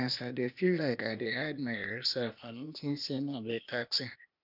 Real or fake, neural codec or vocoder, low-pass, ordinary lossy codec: fake; codec, 16 kHz, 0.8 kbps, ZipCodec; 5.4 kHz; none